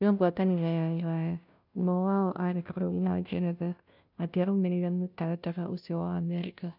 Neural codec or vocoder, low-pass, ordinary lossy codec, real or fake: codec, 16 kHz, 0.5 kbps, FunCodec, trained on Chinese and English, 25 frames a second; 5.4 kHz; none; fake